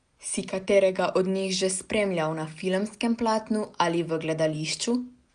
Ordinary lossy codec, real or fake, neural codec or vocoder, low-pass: Opus, 32 kbps; real; none; 9.9 kHz